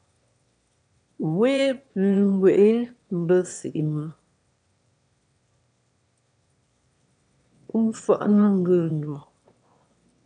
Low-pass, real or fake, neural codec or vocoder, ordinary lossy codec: 9.9 kHz; fake; autoencoder, 22.05 kHz, a latent of 192 numbers a frame, VITS, trained on one speaker; AAC, 64 kbps